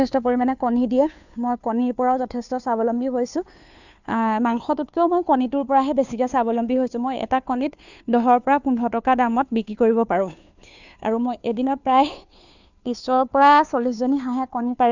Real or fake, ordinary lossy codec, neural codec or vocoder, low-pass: fake; none; codec, 16 kHz, 2 kbps, FunCodec, trained on Chinese and English, 25 frames a second; 7.2 kHz